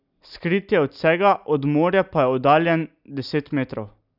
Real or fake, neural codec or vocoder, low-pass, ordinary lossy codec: real; none; 5.4 kHz; none